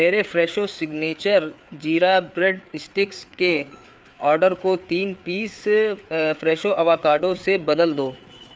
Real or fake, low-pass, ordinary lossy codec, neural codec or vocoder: fake; none; none; codec, 16 kHz, 4 kbps, FreqCodec, larger model